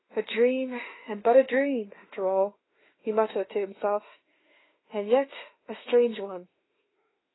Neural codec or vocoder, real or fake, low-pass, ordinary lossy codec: autoencoder, 48 kHz, 32 numbers a frame, DAC-VAE, trained on Japanese speech; fake; 7.2 kHz; AAC, 16 kbps